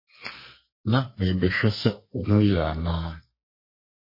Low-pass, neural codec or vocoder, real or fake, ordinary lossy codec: 5.4 kHz; codec, 32 kHz, 1.9 kbps, SNAC; fake; MP3, 24 kbps